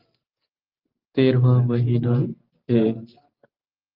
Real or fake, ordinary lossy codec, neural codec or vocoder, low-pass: real; Opus, 24 kbps; none; 5.4 kHz